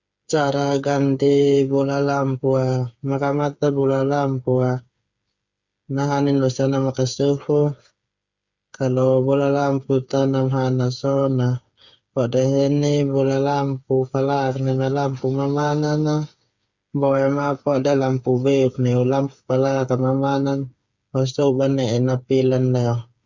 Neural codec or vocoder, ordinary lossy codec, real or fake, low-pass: codec, 16 kHz, 8 kbps, FreqCodec, smaller model; Opus, 64 kbps; fake; 7.2 kHz